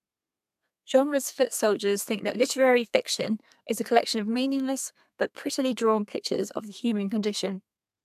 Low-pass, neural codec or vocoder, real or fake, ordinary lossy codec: 14.4 kHz; codec, 32 kHz, 1.9 kbps, SNAC; fake; AAC, 96 kbps